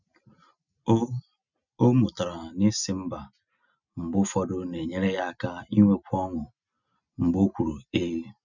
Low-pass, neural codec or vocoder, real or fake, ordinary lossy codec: 7.2 kHz; none; real; none